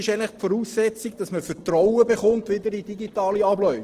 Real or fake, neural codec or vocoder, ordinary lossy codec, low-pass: real; none; Opus, 16 kbps; 14.4 kHz